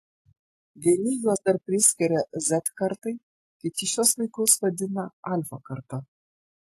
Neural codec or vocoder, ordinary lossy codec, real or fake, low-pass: none; AAC, 48 kbps; real; 14.4 kHz